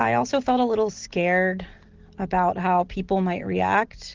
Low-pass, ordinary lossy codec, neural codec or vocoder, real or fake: 7.2 kHz; Opus, 16 kbps; none; real